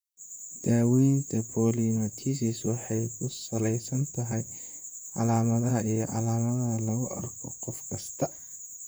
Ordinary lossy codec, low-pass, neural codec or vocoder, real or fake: none; none; vocoder, 44.1 kHz, 128 mel bands, Pupu-Vocoder; fake